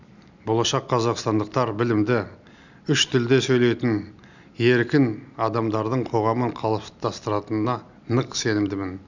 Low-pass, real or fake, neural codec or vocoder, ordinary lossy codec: 7.2 kHz; real; none; none